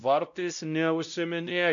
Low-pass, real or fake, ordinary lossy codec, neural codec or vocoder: 7.2 kHz; fake; MP3, 64 kbps; codec, 16 kHz, 0.5 kbps, X-Codec, WavLM features, trained on Multilingual LibriSpeech